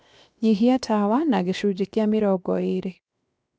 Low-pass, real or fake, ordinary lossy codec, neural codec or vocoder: none; fake; none; codec, 16 kHz, 0.7 kbps, FocalCodec